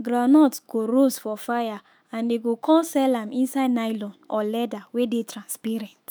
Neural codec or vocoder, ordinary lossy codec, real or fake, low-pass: autoencoder, 48 kHz, 128 numbers a frame, DAC-VAE, trained on Japanese speech; none; fake; none